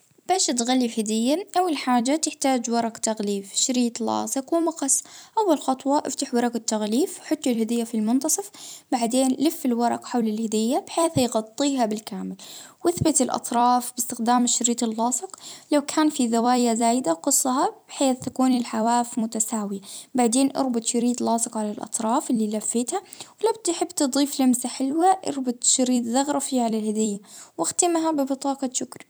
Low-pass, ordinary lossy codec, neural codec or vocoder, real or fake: none; none; vocoder, 44.1 kHz, 128 mel bands every 256 samples, BigVGAN v2; fake